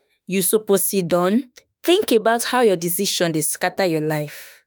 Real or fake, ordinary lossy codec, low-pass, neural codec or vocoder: fake; none; none; autoencoder, 48 kHz, 32 numbers a frame, DAC-VAE, trained on Japanese speech